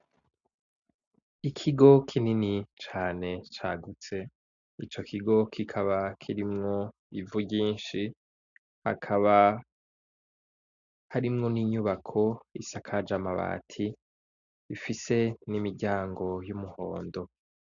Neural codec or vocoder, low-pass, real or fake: none; 7.2 kHz; real